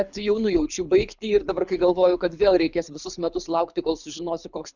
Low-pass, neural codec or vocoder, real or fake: 7.2 kHz; codec, 24 kHz, 6 kbps, HILCodec; fake